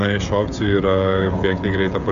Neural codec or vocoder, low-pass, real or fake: codec, 16 kHz, 16 kbps, FreqCodec, smaller model; 7.2 kHz; fake